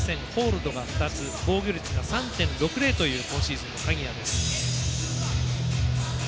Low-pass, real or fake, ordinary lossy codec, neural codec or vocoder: none; real; none; none